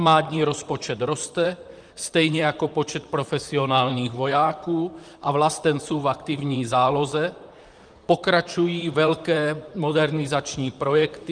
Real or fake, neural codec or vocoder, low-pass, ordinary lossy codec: fake; vocoder, 44.1 kHz, 128 mel bands, Pupu-Vocoder; 9.9 kHz; Opus, 32 kbps